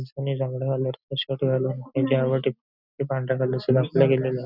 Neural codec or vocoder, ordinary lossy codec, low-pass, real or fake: none; none; 5.4 kHz; real